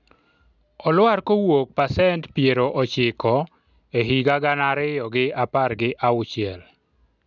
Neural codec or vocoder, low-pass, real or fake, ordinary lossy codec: none; 7.2 kHz; real; none